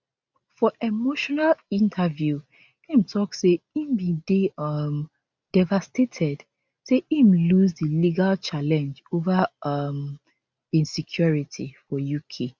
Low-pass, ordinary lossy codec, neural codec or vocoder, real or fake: none; none; none; real